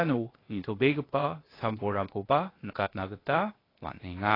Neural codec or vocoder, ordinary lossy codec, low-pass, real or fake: codec, 16 kHz, 0.8 kbps, ZipCodec; AAC, 24 kbps; 5.4 kHz; fake